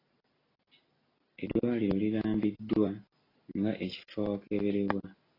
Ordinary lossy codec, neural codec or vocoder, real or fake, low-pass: AAC, 24 kbps; none; real; 5.4 kHz